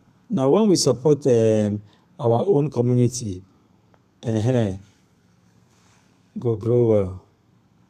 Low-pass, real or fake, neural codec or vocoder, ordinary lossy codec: 14.4 kHz; fake; codec, 32 kHz, 1.9 kbps, SNAC; none